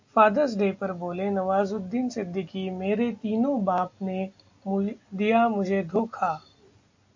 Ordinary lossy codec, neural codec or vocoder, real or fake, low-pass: AAC, 48 kbps; none; real; 7.2 kHz